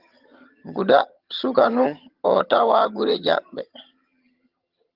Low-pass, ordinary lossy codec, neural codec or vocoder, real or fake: 5.4 kHz; Opus, 32 kbps; vocoder, 22.05 kHz, 80 mel bands, HiFi-GAN; fake